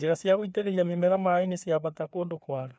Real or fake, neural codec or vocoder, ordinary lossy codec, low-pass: fake; codec, 16 kHz, 2 kbps, FreqCodec, larger model; none; none